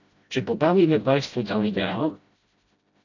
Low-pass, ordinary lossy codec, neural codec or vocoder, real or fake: 7.2 kHz; AAC, 48 kbps; codec, 16 kHz, 0.5 kbps, FreqCodec, smaller model; fake